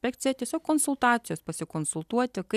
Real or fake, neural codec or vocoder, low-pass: real; none; 14.4 kHz